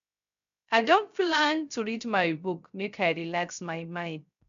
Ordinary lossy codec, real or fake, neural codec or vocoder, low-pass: none; fake; codec, 16 kHz, 0.3 kbps, FocalCodec; 7.2 kHz